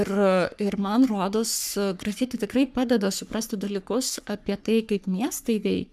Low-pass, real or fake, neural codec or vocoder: 14.4 kHz; fake; codec, 44.1 kHz, 3.4 kbps, Pupu-Codec